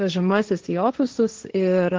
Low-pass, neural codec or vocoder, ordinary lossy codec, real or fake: 7.2 kHz; codec, 16 kHz, 1.1 kbps, Voila-Tokenizer; Opus, 16 kbps; fake